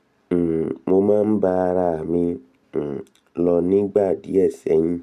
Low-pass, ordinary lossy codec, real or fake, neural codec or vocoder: 14.4 kHz; none; real; none